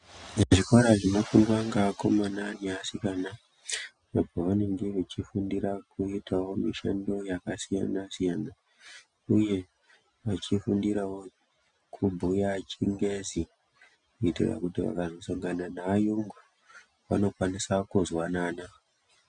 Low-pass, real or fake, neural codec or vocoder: 9.9 kHz; real; none